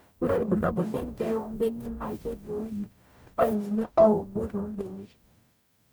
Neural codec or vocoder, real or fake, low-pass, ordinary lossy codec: codec, 44.1 kHz, 0.9 kbps, DAC; fake; none; none